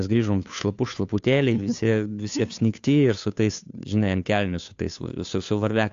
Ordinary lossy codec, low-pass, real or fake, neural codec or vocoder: AAC, 64 kbps; 7.2 kHz; fake; codec, 16 kHz, 2 kbps, FunCodec, trained on Chinese and English, 25 frames a second